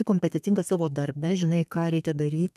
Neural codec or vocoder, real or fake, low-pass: codec, 32 kHz, 1.9 kbps, SNAC; fake; 14.4 kHz